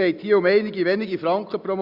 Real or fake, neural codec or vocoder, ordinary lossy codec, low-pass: real; none; Opus, 64 kbps; 5.4 kHz